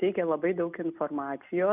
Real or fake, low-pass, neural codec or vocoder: real; 3.6 kHz; none